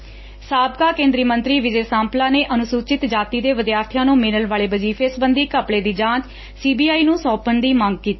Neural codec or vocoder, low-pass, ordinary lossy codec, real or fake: none; 7.2 kHz; MP3, 24 kbps; real